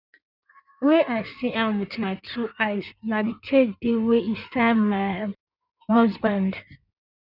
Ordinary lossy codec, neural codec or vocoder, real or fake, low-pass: none; codec, 16 kHz in and 24 kHz out, 1.1 kbps, FireRedTTS-2 codec; fake; 5.4 kHz